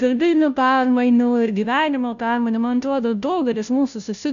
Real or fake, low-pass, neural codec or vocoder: fake; 7.2 kHz; codec, 16 kHz, 0.5 kbps, FunCodec, trained on Chinese and English, 25 frames a second